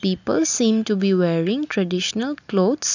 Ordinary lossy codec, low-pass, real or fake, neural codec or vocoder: none; 7.2 kHz; real; none